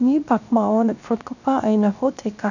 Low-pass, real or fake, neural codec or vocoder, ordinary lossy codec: 7.2 kHz; fake; codec, 16 kHz, 0.7 kbps, FocalCodec; none